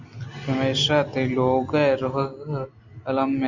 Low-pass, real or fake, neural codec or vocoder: 7.2 kHz; real; none